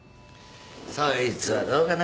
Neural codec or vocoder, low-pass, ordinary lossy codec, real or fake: none; none; none; real